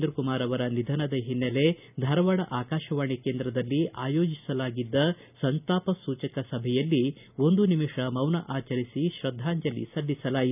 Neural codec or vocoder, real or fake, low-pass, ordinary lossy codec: none; real; 3.6 kHz; none